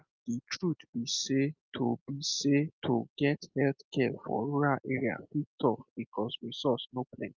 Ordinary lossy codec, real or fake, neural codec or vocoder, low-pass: Opus, 24 kbps; real; none; 7.2 kHz